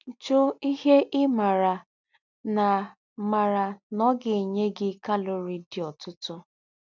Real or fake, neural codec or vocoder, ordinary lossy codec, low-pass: real; none; none; 7.2 kHz